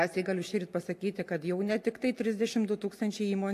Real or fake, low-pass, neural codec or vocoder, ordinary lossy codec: real; 14.4 kHz; none; AAC, 64 kbps